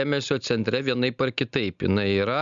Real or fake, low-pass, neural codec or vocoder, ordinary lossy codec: real; 7.2 kHz; none; Opus, 64 kbps